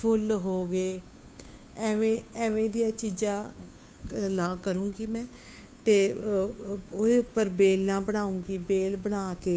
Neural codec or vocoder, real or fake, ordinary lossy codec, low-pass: codec, 16 kHz, 2 kbps, FunCodec, trained on Chinese and English, 25 frames a second; fake; none; none